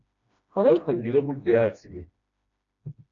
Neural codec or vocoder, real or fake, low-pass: codec, 16 kHz, 1 kbps, FreqCodec, smaller model; fake; 7.2 kHz